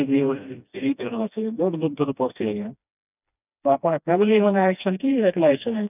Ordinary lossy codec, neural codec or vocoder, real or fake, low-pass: none; codec, 16 kHz, 1 kbps, FreqCodec, smaller model; fake; 3.6 kHz